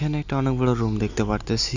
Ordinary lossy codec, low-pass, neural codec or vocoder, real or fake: none; 7.2 kHz; none; real